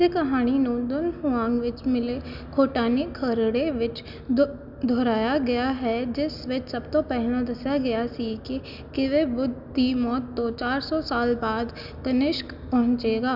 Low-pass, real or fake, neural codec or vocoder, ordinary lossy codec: 5.4 kHz; real; none; none